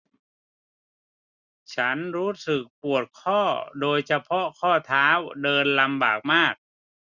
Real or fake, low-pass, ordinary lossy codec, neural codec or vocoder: real; 7.2 kHz; none; none